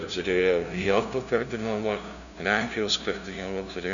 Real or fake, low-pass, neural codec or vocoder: fake; 7.2 kHz; codec, 16 kHz, 0.5 kbps, FunCodec, trained on LibriTTS, 25 frames a second